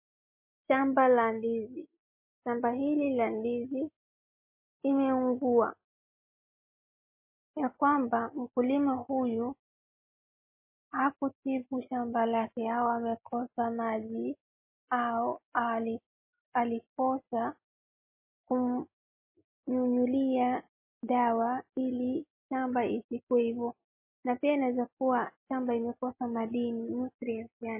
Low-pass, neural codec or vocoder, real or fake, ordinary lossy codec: 3.6 kHz; none; real; MP3, 24 kbps